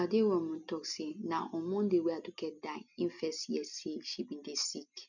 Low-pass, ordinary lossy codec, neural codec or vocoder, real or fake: 7.2 kHz; none; none; real